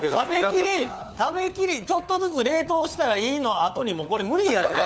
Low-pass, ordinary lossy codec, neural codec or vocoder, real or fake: none; none; codec, 16 kHz, 4 kbps, FunCodec, trained on LibriTTS, 50 frames a second; fake